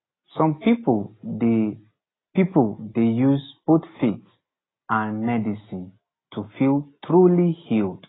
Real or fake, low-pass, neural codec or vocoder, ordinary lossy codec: real; 7.2 kHz; none; AAC, 16 kbps